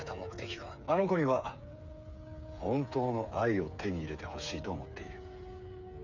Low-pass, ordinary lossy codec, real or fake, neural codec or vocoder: 7.2 kHz; none; fake; codec, 16 kHz, 8 kbps, FreqCodec, smaller model